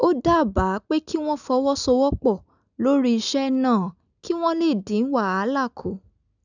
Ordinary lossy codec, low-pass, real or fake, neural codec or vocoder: none; 7.2 kHz; real; none